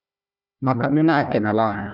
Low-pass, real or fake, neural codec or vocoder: 5.4 kHz; fake; codec, 16 kHz, 1 kbps, FunCodec, trained on Chinese and English, 50 frames a second